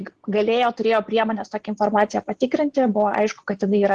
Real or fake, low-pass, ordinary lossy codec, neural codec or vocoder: real; 10.8 kHz; Opus, 16 kbps; none